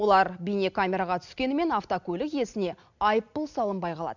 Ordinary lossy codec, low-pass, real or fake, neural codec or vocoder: none; 7.2 kHz; real; none